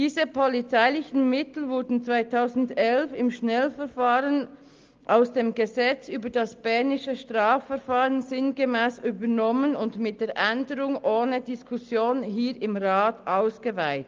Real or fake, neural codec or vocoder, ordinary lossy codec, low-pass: real; none; Opus, 32 kbps; 7.2 kHz